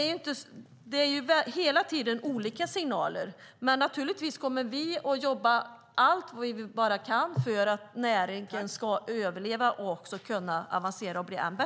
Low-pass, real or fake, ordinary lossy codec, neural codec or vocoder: none; real; none; none